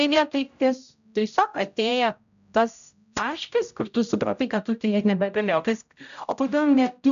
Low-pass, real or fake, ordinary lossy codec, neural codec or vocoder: 7.2 kHz; fake; AAC, 96 kbps; codec, 16 kHz, 0.5 kbps, X-Codec, HuBERT features, trained on general audio